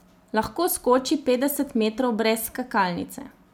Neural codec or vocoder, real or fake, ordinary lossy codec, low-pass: none; real; none; none